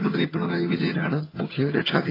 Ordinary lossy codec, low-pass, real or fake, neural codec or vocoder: AAC, 24 kbps; 5.4 kHz; fake; vocoder, 22.05 kHz, 80 mel bands, HiFi-GAN